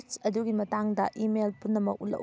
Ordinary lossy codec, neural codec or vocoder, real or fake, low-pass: none; none; real; none